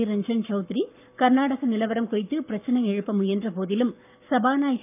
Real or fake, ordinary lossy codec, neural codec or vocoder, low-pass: fake; none; codec, 44.1 kHz, 7.8 kbps, Pupu-Codec; 3.6 kHz